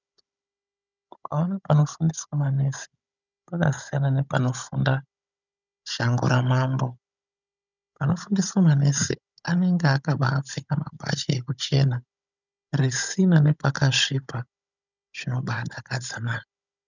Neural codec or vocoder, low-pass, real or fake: codec, 16 kHz, 16 kbps, FunCodec, trained on Chinese and English, 50 frames a second; 7.2 kHz; fake